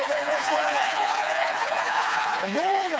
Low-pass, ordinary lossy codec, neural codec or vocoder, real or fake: none; none; codec, 16 kHz, 2 kbps, FreqCodec, smaller model; fake